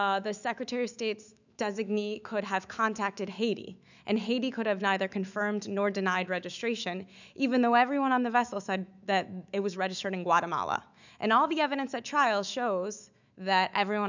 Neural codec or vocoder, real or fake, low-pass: autoencoder, 48 kHz, 128 numbers a frame, DAC-VAE, trained on Japanese speech; fake; 7.2 kHz